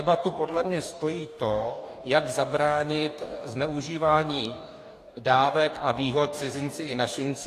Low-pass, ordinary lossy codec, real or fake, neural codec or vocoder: 14.4 kHz; AAC, 64 kbps; fake; codec, 44.1 kHz, 2.6 kbps, DAC